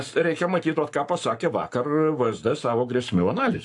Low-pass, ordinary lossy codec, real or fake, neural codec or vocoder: 10.8 kHz; AAC, 64 kbps; fake; codec, 44.1 kHz, 7.8 kbps, Pupu-Codec